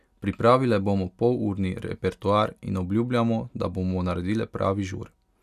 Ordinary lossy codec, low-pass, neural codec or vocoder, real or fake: none; 14.4 kHz; none; real